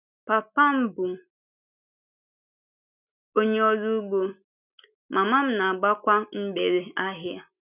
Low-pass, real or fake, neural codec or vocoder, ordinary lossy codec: 3.6 kHz; real; none; none